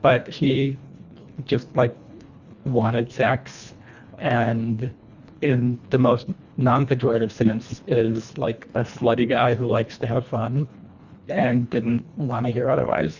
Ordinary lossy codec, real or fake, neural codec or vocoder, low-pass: Opus, 64 kbps; fake; codec, 24 kHz, 1.5 kbps, HILCodec; 7.2 kHz